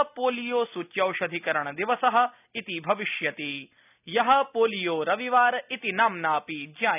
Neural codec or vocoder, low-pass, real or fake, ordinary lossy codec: none; 3.6 kHz; real; none